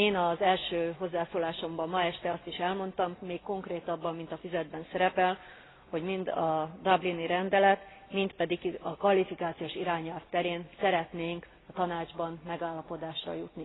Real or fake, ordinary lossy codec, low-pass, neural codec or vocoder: real; AAC, 16 kbps; 7.2 kHz; none